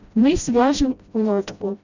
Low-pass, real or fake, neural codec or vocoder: 7.2 kHz; fake; codec, 16 kHz, 0.5 kbps, FreqCodec, smaller model